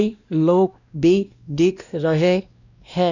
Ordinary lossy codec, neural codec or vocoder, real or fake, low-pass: none; codec, 16 kHz, 1 kbps, X-Codec, WavLM features, trained on Multilingual LibriSpeech; fake; 7.2 kHz